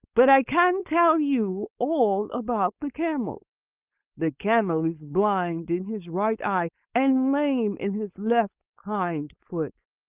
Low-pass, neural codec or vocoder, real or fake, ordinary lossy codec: 3.6 kHz; codec, 16 kHz, 4.8 kbps, FACodec; fake; Opus, 24 kbps